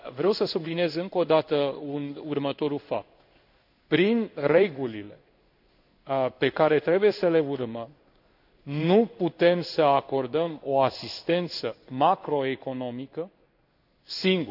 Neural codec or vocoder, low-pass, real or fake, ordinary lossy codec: codec, 16 kHz in and 24 kHz out, 1 kbps, XY-Tokenizer; 5.4 kHz; fake; none